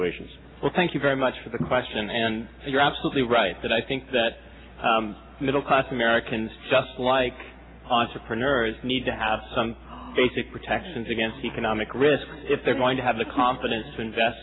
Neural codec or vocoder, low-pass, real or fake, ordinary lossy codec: none; 7.2 kHz; real; AAC, 16 kbps